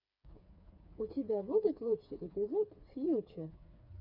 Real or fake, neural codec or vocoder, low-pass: fake; codec, 16 kHz, 4 kbps, FreqCodec, smaller model; 5.4 kHz